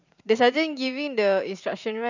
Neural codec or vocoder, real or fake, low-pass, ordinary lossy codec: vocoder, 44.1 kHz, 128 mel bands every 512 samples, BigVGAN v2; fake; 7.2 kHz; none